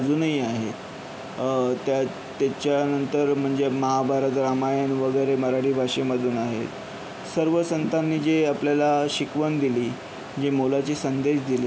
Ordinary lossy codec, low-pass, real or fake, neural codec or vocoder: none; none; real; none